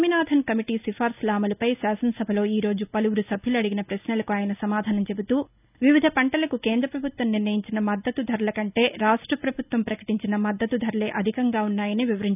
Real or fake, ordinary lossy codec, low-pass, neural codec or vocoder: real; none; 3.6 kHz; none